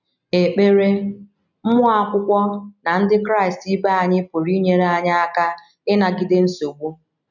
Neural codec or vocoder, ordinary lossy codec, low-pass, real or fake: none; none; 7.2 kHz; real